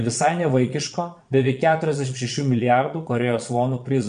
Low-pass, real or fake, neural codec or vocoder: 9.9 kHz; fake; vocoder, 22.05 kHz, 80 mel bands, Vocos